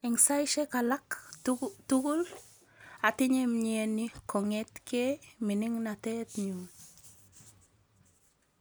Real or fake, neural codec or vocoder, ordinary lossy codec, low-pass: real; none; none; none